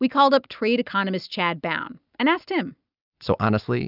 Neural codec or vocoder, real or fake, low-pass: none; real; 5.4 kHz